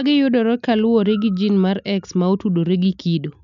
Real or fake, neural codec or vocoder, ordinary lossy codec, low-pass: real; none; none; 7.2 kHz